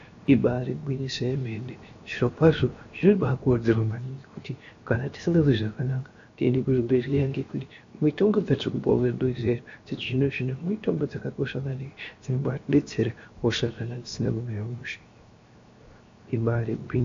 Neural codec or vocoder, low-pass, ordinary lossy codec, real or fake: codec, 16 kHz, 0.7 kbps, FocalCodec; 7.2 kHz; AAC, 48 kbps; fake